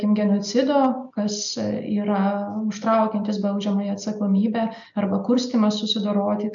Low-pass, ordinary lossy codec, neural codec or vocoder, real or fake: 7.2 kHz; MP3, 96 kbps; none; real